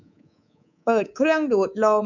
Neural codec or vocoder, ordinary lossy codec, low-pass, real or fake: codec, 24 kHz, 3.1 kbps, DualCodec; none; 7.2 kHz; fake